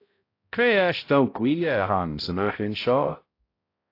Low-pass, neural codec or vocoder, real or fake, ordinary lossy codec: 5.4 kHz; codec, 16 kHz, 0.5 kbps, X-Codec, HuBERT features, trained on balanced general audio; fake; AAC, 32 kbps